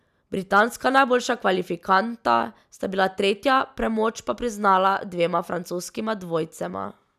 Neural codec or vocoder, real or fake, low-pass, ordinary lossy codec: none; real; 14.4 kHz; none